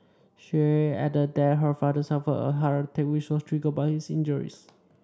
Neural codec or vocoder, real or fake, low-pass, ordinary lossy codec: none; real; none; none